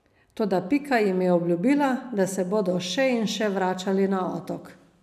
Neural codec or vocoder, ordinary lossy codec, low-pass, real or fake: none; none; 14.4 kHz; real